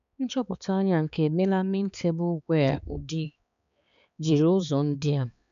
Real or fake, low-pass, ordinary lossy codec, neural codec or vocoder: fake; 7.2 kHz; none; codec, 16 kHz, 4 kbps, X-Codec, HuBERT features, trained on balanced general audio